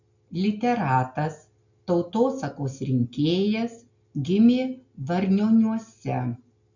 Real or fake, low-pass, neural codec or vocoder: real; 7.2 kHz; none